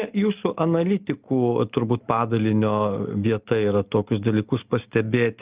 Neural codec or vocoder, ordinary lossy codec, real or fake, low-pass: none; Opus, 24 kbps; real; 3.6 kHz